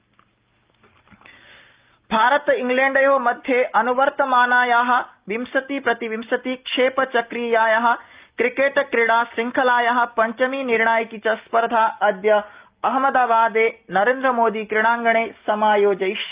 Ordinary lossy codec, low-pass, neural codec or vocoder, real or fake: Opus, 24 kbps; 3.6 kHz; none; real